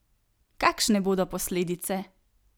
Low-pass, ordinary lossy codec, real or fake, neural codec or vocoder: none; none; real; none